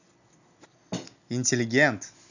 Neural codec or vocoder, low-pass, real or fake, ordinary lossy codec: none; 7.2 kHz; real; none